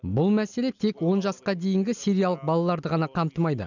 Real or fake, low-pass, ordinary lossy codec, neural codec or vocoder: real; 7.2 kHz; none; none